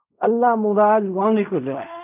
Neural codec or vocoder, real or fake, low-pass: codec, 16 kHz in and 24 kHz out, 0.4 kbps, LongCat-Audio-Codec, fine tuned four codebook decoder; fake; 3.6 kHz